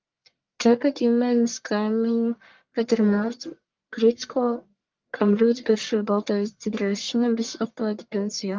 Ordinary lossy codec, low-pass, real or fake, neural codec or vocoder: Opus, 32 kbps; 7.2 kHz; fake; codec, 44.1 kHz, 1.7 kbps, Pupu-Codec